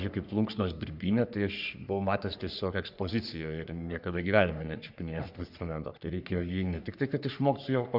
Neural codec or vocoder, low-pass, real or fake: codec, 44.1 kHz, 3.4 kbps, Pupu-Codec; 5.4 kHz; fake